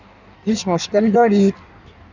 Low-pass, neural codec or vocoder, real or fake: 7.2 kHz; codec, 16 kHz in and 24 kHz out, 1.1 kbps, FireRedTTS-2 codec; fake